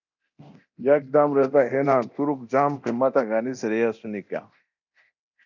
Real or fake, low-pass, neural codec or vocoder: fake; 7.2 kHz; codec, 24 kHz, 0.9 kbps, DualCodec